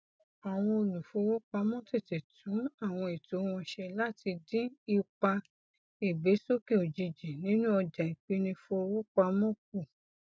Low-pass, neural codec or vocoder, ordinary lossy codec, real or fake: none; none; none; real